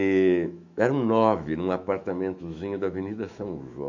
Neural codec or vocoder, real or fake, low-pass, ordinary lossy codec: autoencoder, 48 kHz, 128 numbers a frame, DAC-VAE, trained on Japanese speech; fake; 7.2 kHz; none